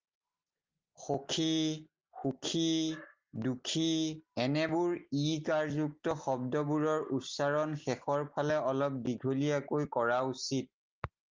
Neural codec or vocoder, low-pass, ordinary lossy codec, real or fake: none; 7.2 kHz; Opus, 32 kbps; real